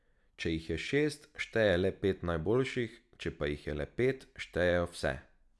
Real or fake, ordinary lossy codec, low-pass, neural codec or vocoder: real; none; none; none